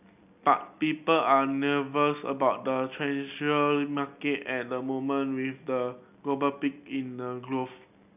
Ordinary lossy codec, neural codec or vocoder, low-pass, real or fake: none; none; 3.6 kHz; real